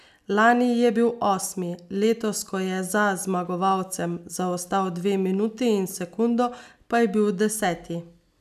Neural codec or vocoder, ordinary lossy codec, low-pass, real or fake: none; none; 14.4 kHz; real